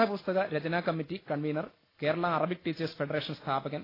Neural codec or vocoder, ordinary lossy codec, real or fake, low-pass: none; AAC, 24 kbps; real; 5.4 kHz